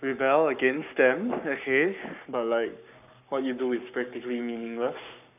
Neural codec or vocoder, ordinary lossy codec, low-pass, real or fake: codec, 44.1 kHz, 7.8 kbps, Pupu-Codec; none; 3.6 kHz; fake